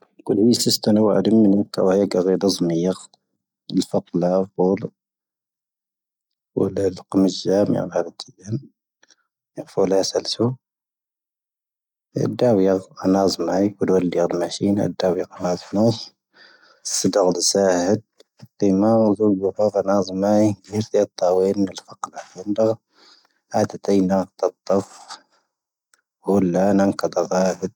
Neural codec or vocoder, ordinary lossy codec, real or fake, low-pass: none; none; real; 19.8 kHz